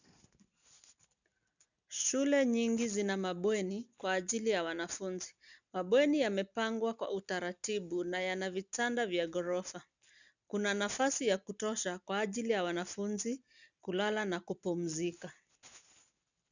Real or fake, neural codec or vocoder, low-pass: real; none; 7.2 kHz